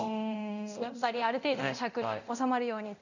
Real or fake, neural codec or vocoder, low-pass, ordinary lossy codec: fake; codec, 24 kHz, 0.9 kbps, DualCodec; 7.2 kHz; none